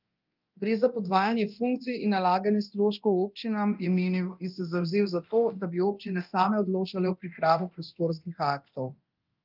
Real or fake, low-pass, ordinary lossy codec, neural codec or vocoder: fake; 5.4 kHz; Opus, 24 kbps; codec, 24 kHz, 0.9 kbps, DualCodec